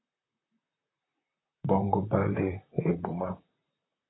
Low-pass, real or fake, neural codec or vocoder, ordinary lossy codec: 7.2 kHz; fake; vocoder, 44.1 kHz, 128 mel bands, Pupu-Vocoder; AAC, 16 kbps